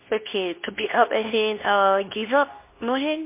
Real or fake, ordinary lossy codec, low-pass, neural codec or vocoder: fake; MP3, 24 kbps; 3.6 kHz; codec, 24 kHz, 0.9 kbps, WavTokenizer, medium speech release version 2